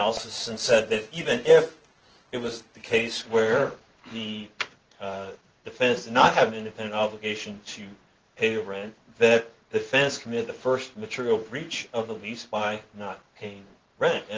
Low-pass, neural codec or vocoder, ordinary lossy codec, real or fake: 7.2 kHz; codec, 16 kHz in and 24 kHz out, 1 kbps, XY-Tokenizer; Opus, 16 kbps; fake